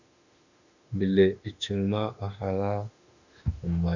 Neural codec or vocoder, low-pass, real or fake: autoencoder, 48 kHz, 32 numbers a frame, DAC-VAE, trained on Japanese speech; 7.2 kHz; fake